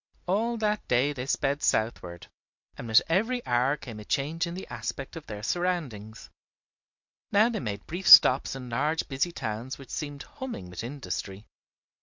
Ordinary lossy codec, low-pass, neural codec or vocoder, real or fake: MP3, 64 kbps; 7.2 kHz; none; real